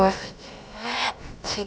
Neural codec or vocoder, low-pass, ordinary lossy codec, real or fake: codec, 16 kHz, about 1 kbps, DyCAST, with the encoder's durations; none; none; fake